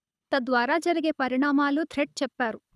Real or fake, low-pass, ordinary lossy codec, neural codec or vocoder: fake; none; none; codec, 24 kHz, 6 kbps, HILCodec